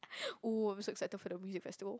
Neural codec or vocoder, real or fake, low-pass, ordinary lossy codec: none; real; none; none